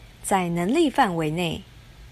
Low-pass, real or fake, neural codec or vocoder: 14.4 kHz; real; none